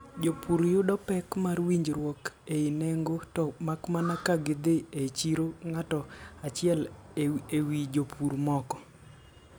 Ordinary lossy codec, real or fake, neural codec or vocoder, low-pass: none; real; none; none